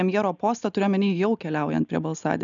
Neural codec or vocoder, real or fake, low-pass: none; real; 7.2 kHz